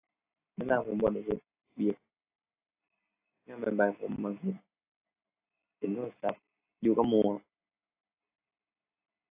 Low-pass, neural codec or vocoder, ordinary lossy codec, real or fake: 3.6 kHz; none; AAC, 24 kbps; real